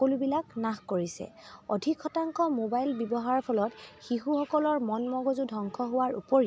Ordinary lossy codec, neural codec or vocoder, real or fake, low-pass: none; none; real; none